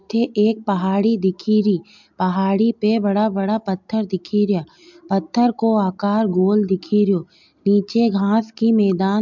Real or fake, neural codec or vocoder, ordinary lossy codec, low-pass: real; none; MP3, 64 kbps; 7.2 kHz